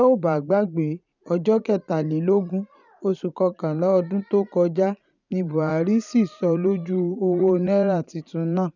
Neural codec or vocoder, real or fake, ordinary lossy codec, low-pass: vocoder, 44.1 kHz, 80 mel bands, Vocos; fake; none; 7.2 kHz